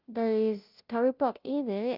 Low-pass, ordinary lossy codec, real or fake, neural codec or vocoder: 5.4 kHz; Opus, 24 kbps; fake; codec, 16 kHz, 0.5 kbps, FunCodec, trained on Chinese and English, 25 frames a second